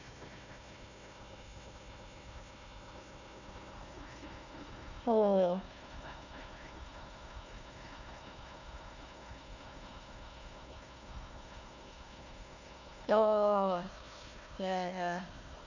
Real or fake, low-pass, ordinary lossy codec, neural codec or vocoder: fake; 7.2 kHz; none; codec, 16 kHz, 1 kbps, FunCodec, trained on Chinese and English, 50 frames a second